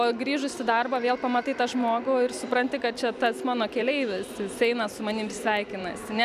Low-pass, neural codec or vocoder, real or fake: 14.4 kHz; none; real